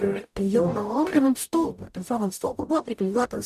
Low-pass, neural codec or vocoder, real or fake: 14.4 kHz; codec, 44.1 kHz, 0.9 kbps, DAC; fake